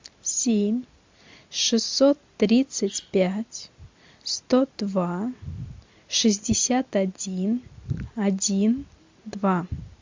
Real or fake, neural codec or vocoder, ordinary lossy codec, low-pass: real; none; MP3, 64 kbps; 7.2 kHz